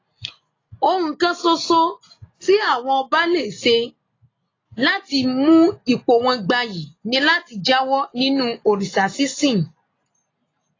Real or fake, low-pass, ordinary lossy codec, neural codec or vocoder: real; 7.2 kHz; AAC, 32 kbps; none